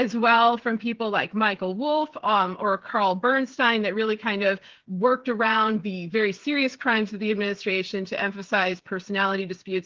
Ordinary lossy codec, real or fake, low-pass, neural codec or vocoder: Opus, 16 kbps; fake; 7.2 kHz; codec, 16 kHz, 8 kbps, FreqCodec, smaller model